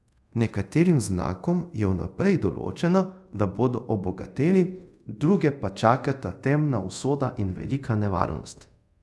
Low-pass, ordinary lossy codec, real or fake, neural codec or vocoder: none; none; fake; codec, 24 kHz, 0.5 kbps, DualCodec